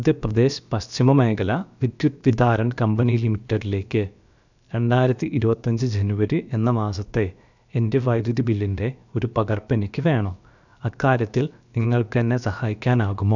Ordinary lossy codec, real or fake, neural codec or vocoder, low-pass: none; fake; codec, 16 kHz, about 1 kbps, DyCAST, with the encoder's durations; 7.2 kHz